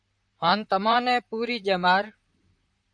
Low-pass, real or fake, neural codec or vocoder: 9.9 kHz; fake; vocoder, 44.1 kHz, 128 mel bands, Pupu-Vocoder